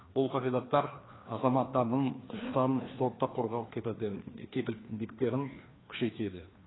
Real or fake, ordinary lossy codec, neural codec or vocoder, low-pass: fake; AAC, 16 kbps; codec, 16 kHz, 2 kbps, FreqCodec, larger model; 7.2 kHz